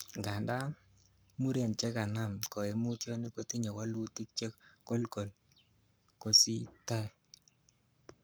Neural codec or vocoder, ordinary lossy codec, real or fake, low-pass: codec, 44.1 kHz, 7.8 kbps, Pupu-Codec; none; fake; none